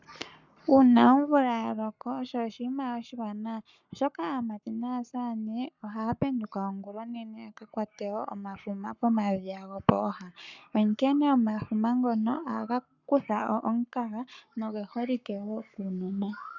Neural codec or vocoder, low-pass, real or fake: codec, 44.1 kHz, 7.8 kbps, Pupu-Codec; 7.2 kHz; fake